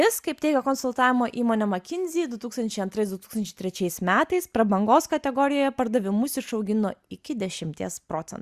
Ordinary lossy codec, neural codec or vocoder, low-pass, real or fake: Opus, 64 kbps; none; 14.4 kHz; real